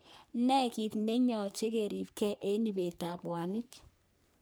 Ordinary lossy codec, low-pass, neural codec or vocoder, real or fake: none; none; codec, 44.1 kHz, 3.4 kbps, Pupu-Codec; fake